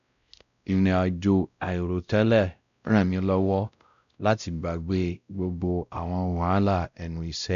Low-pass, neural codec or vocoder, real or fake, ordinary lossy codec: 7.2 kHz; codec, 16 kHz, 0.5 kbps, X-Codec, WavLM features, trained on Multilingual LibriSpeech; fake; none